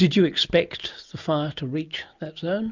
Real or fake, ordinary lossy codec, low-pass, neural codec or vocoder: real; MP3, 64 kbps; 7.2 kHz; none